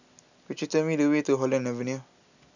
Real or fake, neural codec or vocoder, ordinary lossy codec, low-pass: real; none; none; 7.2 kHz